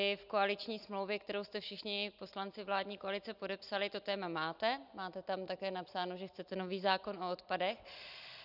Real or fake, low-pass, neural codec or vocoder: real; 5.4 kHz; none